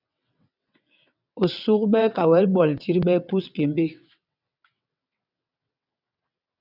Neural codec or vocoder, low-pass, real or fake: vocoder, 22.05 kHz, 80 mel bands, WaveNeXt; 5.4 kHz; fake